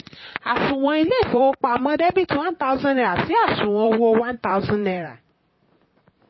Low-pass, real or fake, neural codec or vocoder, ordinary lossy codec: 7.2 kHz; fake; vocoder, 44.1 kHz, 128 mel bands, Pupu-Vocoder; MP3, 24 kbps